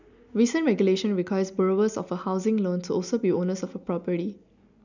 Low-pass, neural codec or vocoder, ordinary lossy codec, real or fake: 7.2 kHz; none; none; real